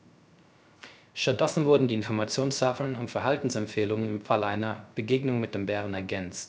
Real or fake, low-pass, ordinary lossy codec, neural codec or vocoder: fake; none; none; codec, 16 kHz, 0.3 kbps, FocalCodec